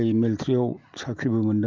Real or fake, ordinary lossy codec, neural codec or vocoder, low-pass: real; Opus, 24 kbps; none; 7.2 kHz